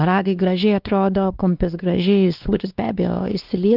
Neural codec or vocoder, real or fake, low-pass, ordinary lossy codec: codec, 16 kHz, 2 kbps, X-Codec, WavLM features, trained on Multilingual LibriSpeech; fake; 5.4 kHz; Opus, 32 kbps